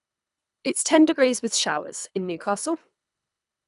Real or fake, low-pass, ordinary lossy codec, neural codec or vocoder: fake; 10.8 kHz; none; codec, 24 kHz, 3 kbps, HILCodec